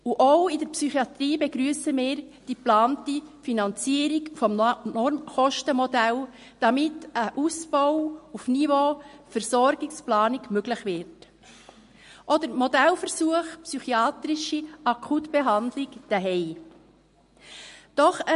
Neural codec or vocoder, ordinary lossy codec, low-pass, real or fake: none; MP3, 48 kbps; 10.8 kHz; real